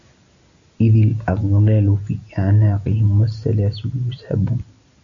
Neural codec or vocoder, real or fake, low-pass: none; real; 7.2 kHz